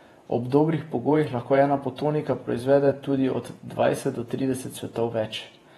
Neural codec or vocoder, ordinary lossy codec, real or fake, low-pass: vocoder, 48 kHz, 128 mel bands, Vocos; AAC, 32 kbps; fake; 19.8 kHz